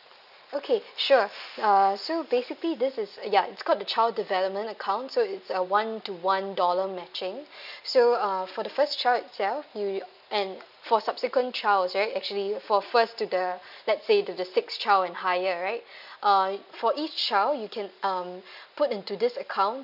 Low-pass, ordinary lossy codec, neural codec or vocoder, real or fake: 5.4 kHz; none; none; real